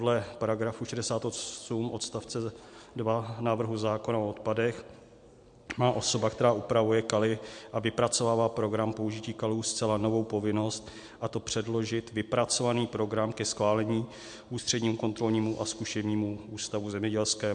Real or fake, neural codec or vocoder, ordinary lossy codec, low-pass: real; none; MP3, 64 kbps; 9.9 kHz